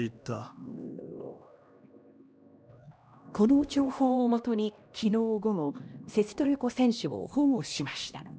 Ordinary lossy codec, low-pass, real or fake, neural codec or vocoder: none; none; fake; codec, 16 kHz, 1 kbps, X-Codec, HuBERT features, trained on LibriSpeech